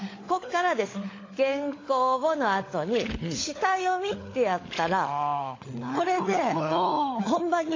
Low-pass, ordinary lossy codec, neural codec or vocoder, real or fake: 7.2 kHz; AAC, 32 kbps; codec, 16 kHz, 16 kbps, FunCodec, trained on LibriTTS, 50 frames a second; fake